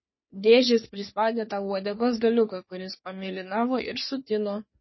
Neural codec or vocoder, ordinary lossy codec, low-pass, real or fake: codec, 44.1 kHz, 3.4 kbps, Pupu-Codec; MP3, 24 kbps; 7.2 kHz; fake